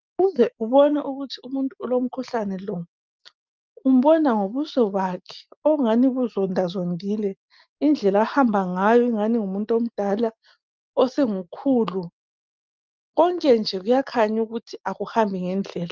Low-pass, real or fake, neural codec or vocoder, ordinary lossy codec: 7.2 kHz; real; none; Opus, 24 kbps